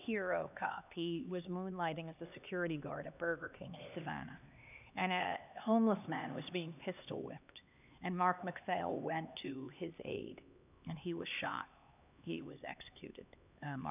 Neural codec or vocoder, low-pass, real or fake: codec, 16 kHz, 2 kbps, X-Codec, HuBERT features, trained on LibriSpeech; 3.6 kHz; fake